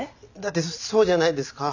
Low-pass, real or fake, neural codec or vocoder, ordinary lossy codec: 7.2 kHz; real; none; none